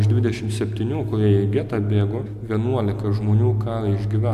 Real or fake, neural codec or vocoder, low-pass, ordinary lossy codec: real; none; 14.4 kHz; AAC, 96 kbps